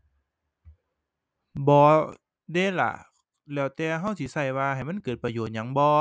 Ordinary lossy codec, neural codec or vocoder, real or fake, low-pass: none; none; real; none